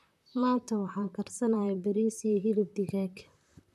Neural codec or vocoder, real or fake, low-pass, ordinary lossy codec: vocoder, 44.1 kHz, 128 mel bands, Pupu-Vocoder; fake; 14.4 kHz; none